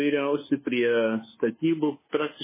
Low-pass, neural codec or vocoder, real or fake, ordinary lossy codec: 3.6 kHz; codec, 24 kHz, 1.2 kbps, DualCodec; fake; MP3, 16 kbps